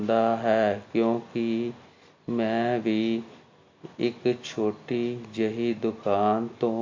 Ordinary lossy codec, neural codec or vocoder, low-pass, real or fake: MP3, 48 kbps; none; 7.2 kHz; real